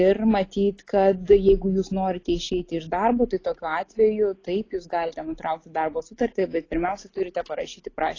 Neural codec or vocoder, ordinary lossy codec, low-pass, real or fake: none; AAC, 32 kbps; 7.2 kHz; real